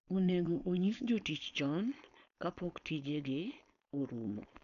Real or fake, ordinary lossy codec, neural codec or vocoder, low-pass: fake; none; codec, 16 kHz, 4.8 kbps, FACodec; 7.2 kHz